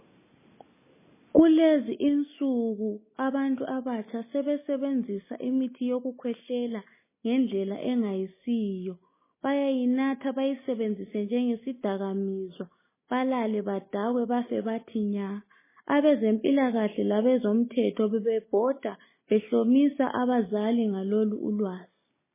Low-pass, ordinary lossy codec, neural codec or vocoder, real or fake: 3.6 kHz; MP3, 16 kbps; none; real